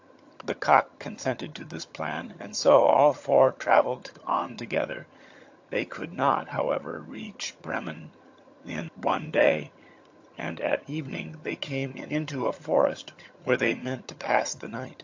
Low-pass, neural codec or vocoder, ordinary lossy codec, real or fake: 7.2 kHz; vocoder, 22.05 kHz, 80 mel bands, HiFi-GAN; AAC, 48 kbps; fake